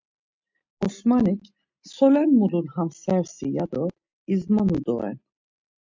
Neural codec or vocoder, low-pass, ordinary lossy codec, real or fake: none; 7.2 kHz; MP3, 64 kbps; real